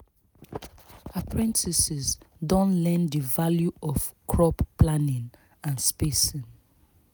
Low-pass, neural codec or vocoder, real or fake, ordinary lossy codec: none; none; real; none